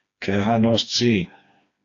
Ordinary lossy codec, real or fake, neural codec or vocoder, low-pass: AAC, 48 kbps; fake; codec, 16 kHz, 2 kbps, FreqCodec, smaller model; 7.2 kHz